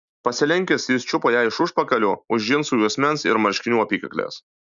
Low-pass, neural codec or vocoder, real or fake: 7.2 kHz; none; real